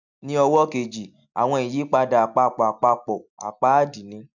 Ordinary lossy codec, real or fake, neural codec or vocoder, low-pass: none; real; none; 7.2 kHz